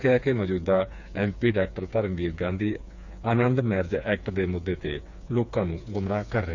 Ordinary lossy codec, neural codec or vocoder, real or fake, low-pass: none; codec, 16 kHz, 4 kbps, FreqCodec, smaller model; fake; 7.2 kHz